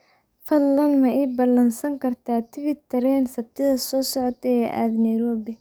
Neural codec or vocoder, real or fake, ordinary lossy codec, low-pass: codec, 44.1 kHz, 7.8 kbps, DAC; fake; none; none